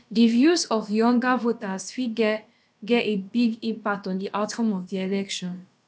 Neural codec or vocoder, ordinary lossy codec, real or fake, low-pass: codec, 16 kHz, about 1 kbps, DyCAST, with the encoder's durations; none; fake; none